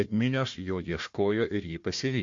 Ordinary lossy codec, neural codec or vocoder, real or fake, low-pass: MP3, 48 kbps; codec, 16 kHz, 1 kbps, FunCodec, trained on Chinese and English, 50 frames a second; fake; 7.2 kHz